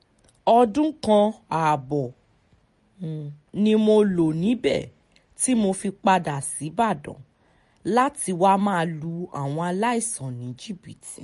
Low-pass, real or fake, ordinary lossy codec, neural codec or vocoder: 14.4 kHz; real; MP3, 48 kbps; none